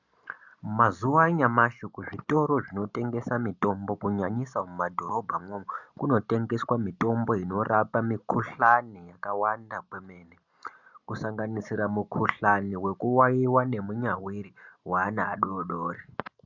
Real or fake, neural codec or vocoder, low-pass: real; none; 7.2 kHz